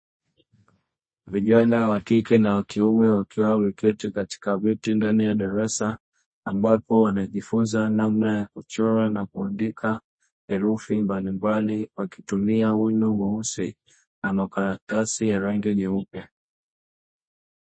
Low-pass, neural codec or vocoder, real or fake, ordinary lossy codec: 9.9 kHz; codec, 24 kHz, 0.9 kbps, WavTokenizer, medium music audio release; fake; MP3, 32 kbps